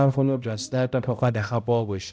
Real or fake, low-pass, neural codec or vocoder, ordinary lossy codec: fake; none; codec, 16 kHz, 0.5 kbps, X-Codec, HuBERT features, trained on balanced general audio; none